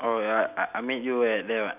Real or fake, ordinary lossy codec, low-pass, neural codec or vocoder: real; none; 3.6 kHz; none